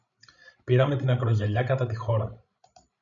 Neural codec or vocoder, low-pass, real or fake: codec, 16 kHz, 16 kbps, FreqCodec, larger model; 7.2 kHz; fake